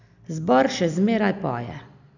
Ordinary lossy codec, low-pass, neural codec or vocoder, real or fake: none; 7.2 kHz; none; real